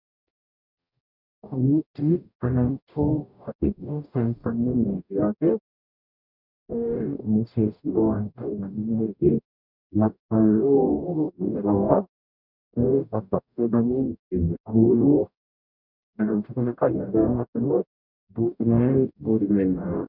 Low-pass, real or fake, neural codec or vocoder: 5.4 kHz; fake; codec, 44.1 kHz, 0.9 kbps, DAC